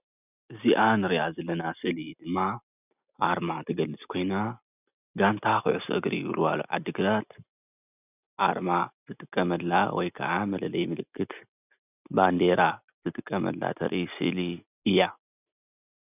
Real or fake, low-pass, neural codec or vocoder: real; 3.6 kHz; none